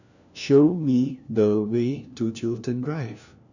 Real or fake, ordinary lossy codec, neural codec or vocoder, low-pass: fake; none; codec, 16 kHz, 1 kbps, FunCodec, trained on LibriTTS, 50 frames a second; 7.2 kHz